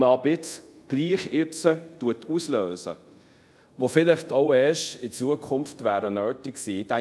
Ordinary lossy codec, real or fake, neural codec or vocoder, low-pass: none; fake; codec, 24 kHz, 0.5 kbps, DualCodec; none